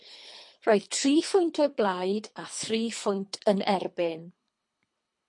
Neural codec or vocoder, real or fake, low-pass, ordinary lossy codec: codec, 24 kHz, 3 kbps, HILCodec; fake; 10.8 kHz; MP3, 48 kbps